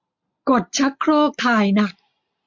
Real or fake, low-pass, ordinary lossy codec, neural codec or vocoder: real; 7.2 kHz; MP3, 48 kbps; none